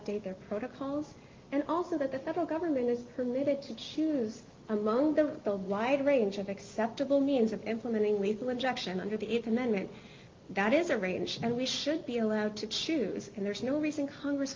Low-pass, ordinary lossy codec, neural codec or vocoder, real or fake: 7.2 kHz; Opus, 32 kbps; none; real